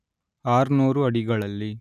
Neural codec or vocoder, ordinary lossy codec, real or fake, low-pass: none; none; real; 14.4 kHz